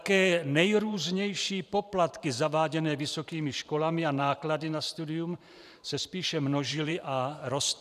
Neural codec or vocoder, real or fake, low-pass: vocoder, 44.1 kHz, 128 mel bands every 512 samples, BigVGAN v2; fake; 14.4 kHz